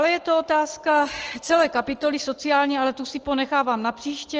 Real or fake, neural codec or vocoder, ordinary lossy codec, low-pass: real; none; Opus, 16 kbps; 7.2 kHz